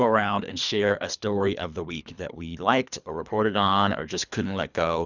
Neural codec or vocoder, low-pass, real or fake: codec, 24 kHz, 3 kbps, HILCodec; 7.2 kHz; fake